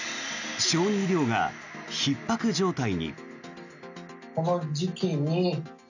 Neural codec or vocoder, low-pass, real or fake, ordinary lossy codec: none; 7.2 kHz; real; none